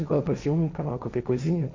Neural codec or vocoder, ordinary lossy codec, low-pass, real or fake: codec, 16 kHz, 1.1 kbps, Voila-Tokenizer; MP3, 64 kbps; 7.2 kHz; fake